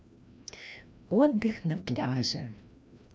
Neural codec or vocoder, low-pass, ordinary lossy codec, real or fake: codec, 16 kHz, 1 kbps, FreqCodec, larger model; none; none; fake